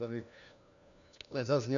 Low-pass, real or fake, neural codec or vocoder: 7.2 kHz; fake; codec, 16 kHz, 1 kbps, FunCodec, trained on LibriTTS, 50 frames a second